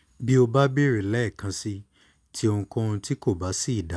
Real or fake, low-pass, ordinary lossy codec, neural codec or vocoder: real; none; none; none